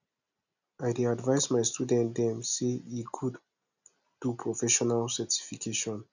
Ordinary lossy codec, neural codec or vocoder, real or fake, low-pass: none; none; real; 7.2 kHz